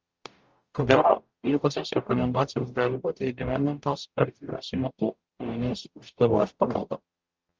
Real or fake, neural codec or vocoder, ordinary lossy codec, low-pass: fake; codec, 44.1 kHz, 0.9 kbps, DAC; Opus, 24 kbps; 7.2 kHz